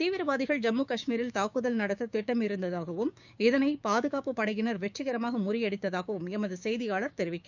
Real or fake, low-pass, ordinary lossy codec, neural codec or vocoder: fake; 7.2 kHz; none; codec, 16 kHz, 6 kbps, DAC